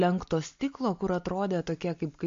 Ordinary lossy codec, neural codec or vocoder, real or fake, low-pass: MP3, 48 kbps; none; real; 7.2 kHz